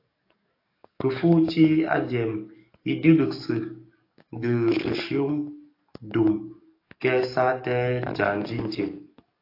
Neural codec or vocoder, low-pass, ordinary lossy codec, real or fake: codec, 44.1 kHz, 7.8 kbps, DAC; 5.4 kHz; AAC, 32 kbps; fake